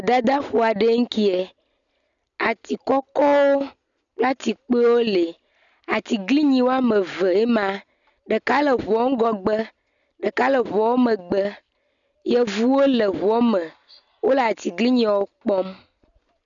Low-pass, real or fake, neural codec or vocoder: 7.2 kHz; real; none